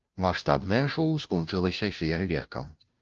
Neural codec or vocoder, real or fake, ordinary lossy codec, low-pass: codec, 16 kHz, 0.5 kbps, FunCodec, trained on Chinese and English, 25 frames a second; fake; Opus, 24 kbps; 7.2 kHz